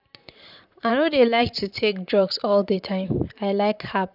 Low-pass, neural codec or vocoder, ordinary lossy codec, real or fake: 5.4 kHz; vocoder, 44.1 kHz, 128 mel bands, Pupu-Vocoder; none; fake